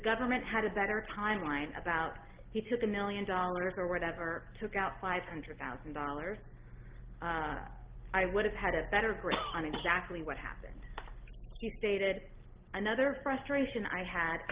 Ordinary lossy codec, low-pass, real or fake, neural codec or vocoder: Opus, 16 kbps; 3.6 kHz; real; none